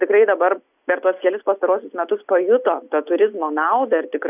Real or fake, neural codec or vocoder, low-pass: real; none; 3.6 kHz